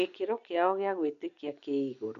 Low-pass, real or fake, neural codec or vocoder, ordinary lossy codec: 7.2 kHz; real; none; MP3, 64 kbps